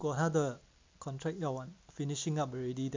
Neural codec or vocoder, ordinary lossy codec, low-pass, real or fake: none; MP3, 64 kbps; 7.2 kHz; real